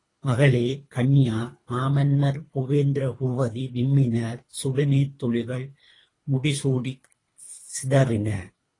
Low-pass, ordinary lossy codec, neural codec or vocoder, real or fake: 10.8 kHz; AAC, 32 kbps; codec, 24 kHz, 3 kbps, HILCodec; fake